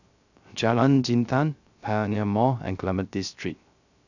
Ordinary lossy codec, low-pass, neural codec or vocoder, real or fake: none; 7.2 kHz; codec, 16 kHz, 0.3 kbps, FocalCodec; fake